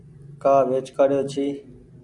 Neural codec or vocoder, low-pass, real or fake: none; 10.8 kHz; real